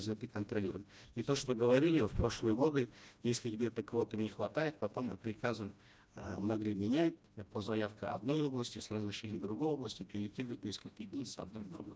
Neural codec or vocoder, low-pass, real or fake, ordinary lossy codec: codec, 16 kHz, 1 kbps, FreqCodec, smaller model; none; fake; none